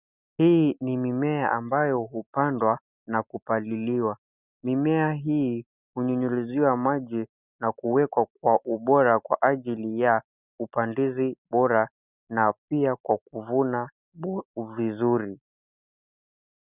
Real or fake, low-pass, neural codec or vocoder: real; 3.6 kHz; none